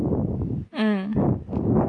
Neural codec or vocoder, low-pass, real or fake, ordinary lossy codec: none; 9.9 kHz; real; none